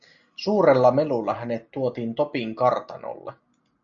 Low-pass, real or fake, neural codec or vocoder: 7.2 kHz; real; none